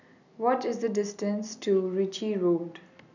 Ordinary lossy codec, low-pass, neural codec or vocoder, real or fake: none; 7.2 kHz; none; real